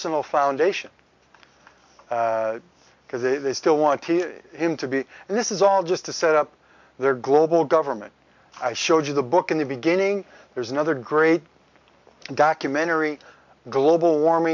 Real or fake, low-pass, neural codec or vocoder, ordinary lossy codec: real; 7.2 kHz; none; MP3, 64 kbps